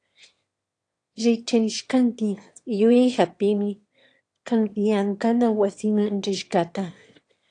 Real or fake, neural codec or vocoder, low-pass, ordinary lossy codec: fake; autoencoder, 22.05 kHz, a latent of 192 numbers a frame, VITS, trained on one speaker; 9.9 kHz; AAC, 48 kbps